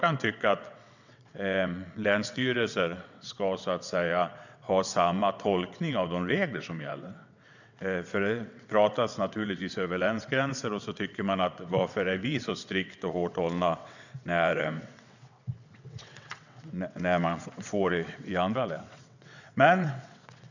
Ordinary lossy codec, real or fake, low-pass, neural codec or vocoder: none; fake; 7.2 kHz; vocoder, 22.05 kHz, 80 mel bands, WaveNeXt